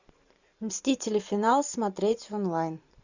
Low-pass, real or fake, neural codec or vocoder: 7.2 kHz; fake; vocoder, 44.1 kHz, 128 mel bands every 512 samples, BigVGAN v2